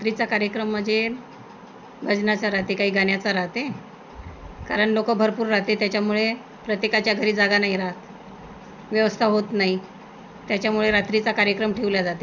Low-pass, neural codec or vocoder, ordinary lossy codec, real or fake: 7.2 kHz; none; none; real